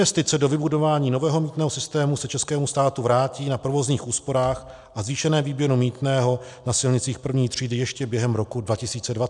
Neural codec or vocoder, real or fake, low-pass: none; real; 10.8 kHz